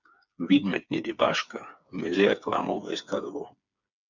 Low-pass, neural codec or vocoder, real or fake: 7.2 kHz; codec, 16 kHz, 4 kbps, FreqCodec, smaller model; fake